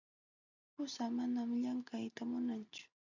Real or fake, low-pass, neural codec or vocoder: real; 7.2 kHz; none